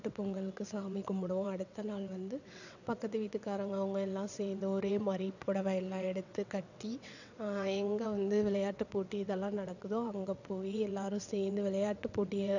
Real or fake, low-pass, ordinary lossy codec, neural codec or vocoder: fake; 7.2 kHz; none; vocoder, 22.05 kHz, 80 mel bands, WaveNeXt